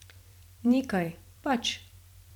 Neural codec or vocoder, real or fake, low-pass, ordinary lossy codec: vocoder, 44.1 kHz, 128 mel bands every 256 samples, BigVGAN v2; fake; 19.8 kHz; none